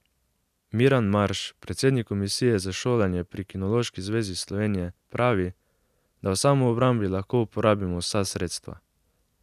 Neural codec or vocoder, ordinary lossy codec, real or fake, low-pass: none; none; real; 14.4 kHz